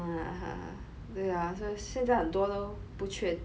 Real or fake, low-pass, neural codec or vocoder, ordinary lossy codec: real; none; none; none